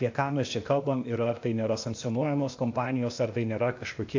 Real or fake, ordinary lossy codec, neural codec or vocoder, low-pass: fake; AAC, 48 kbps; codec, 16 kHz, 0.8 kbps, ZipCodec; 7.2 kHz